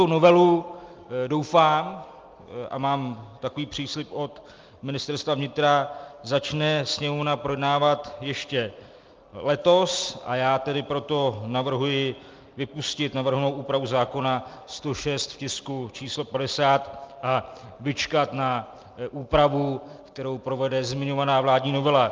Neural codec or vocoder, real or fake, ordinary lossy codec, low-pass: none; real; Opus, 24 kbps; 7.2 kHz